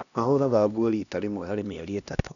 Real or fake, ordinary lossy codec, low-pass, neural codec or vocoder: fake; none; 7.2 kHz; codec, 16 kHz, 1 kbps, X-Codec, HuBERT features, trained on LibriSpeech